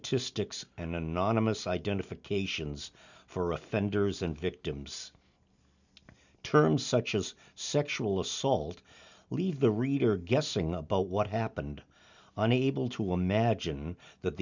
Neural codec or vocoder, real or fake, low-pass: none; real; 7.2 kHz